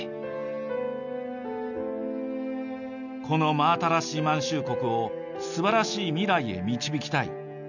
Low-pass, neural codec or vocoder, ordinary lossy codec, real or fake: 7.2 kHz; none; none; real